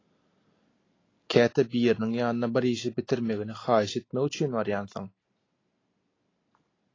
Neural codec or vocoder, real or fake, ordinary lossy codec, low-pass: none; real; AAC, 32 kbps; 7.2 kHz